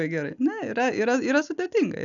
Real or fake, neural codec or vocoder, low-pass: real; none; 7.2 kHz